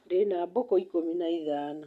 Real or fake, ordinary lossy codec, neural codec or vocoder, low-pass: real; none; none; 14.4 kHz